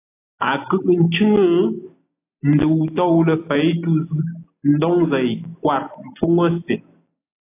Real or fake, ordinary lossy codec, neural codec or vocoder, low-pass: real; AAC, 24 kbps; none; 3.6 kHz